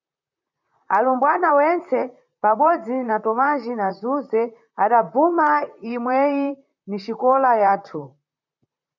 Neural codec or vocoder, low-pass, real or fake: vocoder, 44.1 kHz, 128 mel bands, Pupu-Vocoder; 7.2 kHz; fake